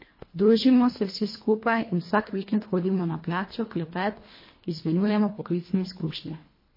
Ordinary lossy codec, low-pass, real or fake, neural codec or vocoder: MP3, 24 kbps; 5.4 kHz; fake; codec, 24 kHz, 1.5 kbps, HILCodec